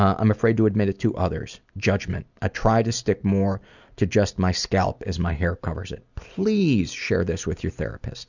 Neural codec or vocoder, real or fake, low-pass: none; real; 7.2 kHz